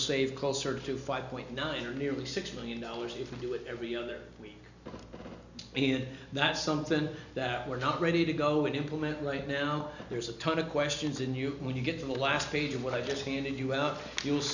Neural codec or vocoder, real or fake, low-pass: none; real; 7.2 kHz